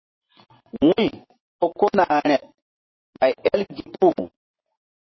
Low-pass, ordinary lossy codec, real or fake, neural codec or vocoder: 7.2 kHz; MP3, 24 kbps; fake; vocoder, 24 kHz, 100 mel bands, Vocos